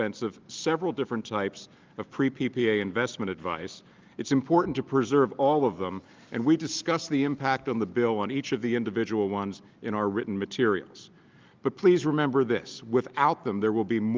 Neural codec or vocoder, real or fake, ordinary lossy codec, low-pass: none; real; Opus, 24 kbps; 7.2 kHz